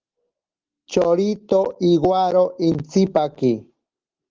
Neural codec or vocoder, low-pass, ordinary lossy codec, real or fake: none; 7.2 kHz; Opus, 16 kbps; real